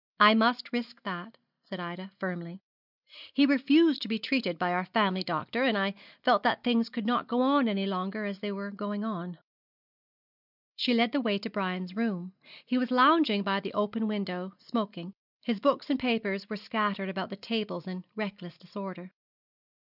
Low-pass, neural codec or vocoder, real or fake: 5.4 kHz; none; real